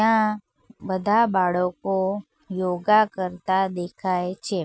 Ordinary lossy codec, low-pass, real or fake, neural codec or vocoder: none; none; real; none